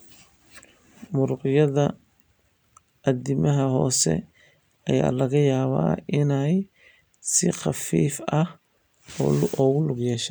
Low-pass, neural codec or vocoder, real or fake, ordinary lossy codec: none; none; real; none